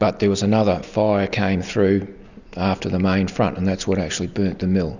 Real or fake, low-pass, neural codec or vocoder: real; 7.2 kHz; none